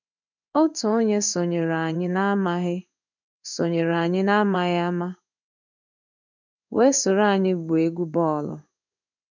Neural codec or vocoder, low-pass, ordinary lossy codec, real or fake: codec, 16 kHz in and 24 kHz out, 1 kbps, XY-Tokenizer; 7.2 kHz; none; fake